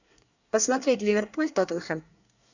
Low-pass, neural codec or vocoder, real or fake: 7.2 kHz; codec, 24 kHz, 1 kbps, SNAC; fake